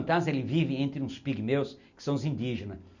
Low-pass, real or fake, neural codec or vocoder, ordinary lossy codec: 7.2 kHz; real; none; none